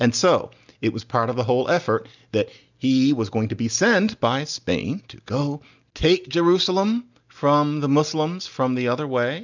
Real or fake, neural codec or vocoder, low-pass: real; none; 7.2 kHz